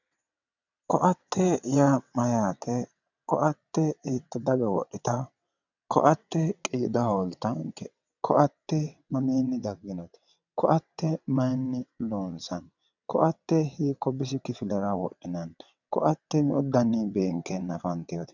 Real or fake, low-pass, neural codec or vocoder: fake; 7.2 kHz; vocoder, 22.05 kHz, 80 mel bands, WaveNeXt